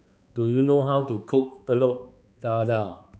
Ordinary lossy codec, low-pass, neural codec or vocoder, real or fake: none; none; codec, 16 kHz, 2 kbps, X-Codec, HuBERT features, trained on balanced general audio; fake